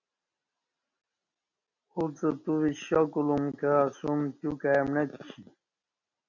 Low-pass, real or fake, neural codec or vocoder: 7.2 kHz; real; none